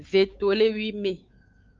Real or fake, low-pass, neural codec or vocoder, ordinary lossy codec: fake; 7.2 kHz; codec, 16 kHz, 4 kbps, X-Codec, WavLM features, trained on Multilingual LibriSpeech; Opus, 24 kbps